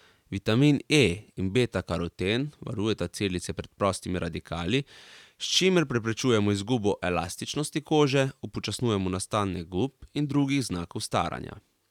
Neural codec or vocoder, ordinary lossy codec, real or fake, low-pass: vocoder, 44.1 kHz, 128 mel bands every 512 samples, BigVGAN v2; none; fake; 19.8 kHz